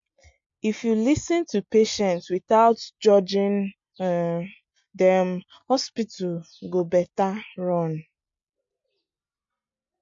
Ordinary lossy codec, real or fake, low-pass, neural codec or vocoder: MP3, 48 kbps; real; 7.2 kHz; none